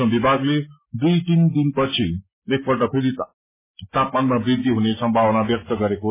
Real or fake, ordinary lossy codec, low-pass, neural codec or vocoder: real; MP3, 16 kbps; 3.6 kHz; none